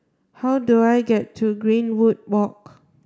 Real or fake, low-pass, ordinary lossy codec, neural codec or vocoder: real; none; none; none